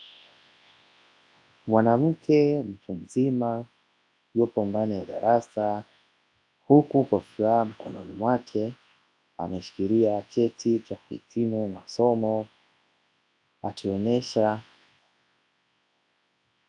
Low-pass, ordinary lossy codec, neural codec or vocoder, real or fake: 10.8 kHz; AAC, 64 kbps; codec, 24 kHz, 0.9 kbps, WavTokenizer, large speech release; fake